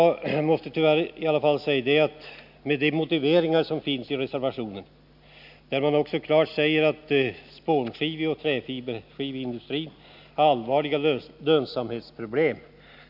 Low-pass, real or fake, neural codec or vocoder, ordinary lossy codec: 5.4 kHz; real; none; none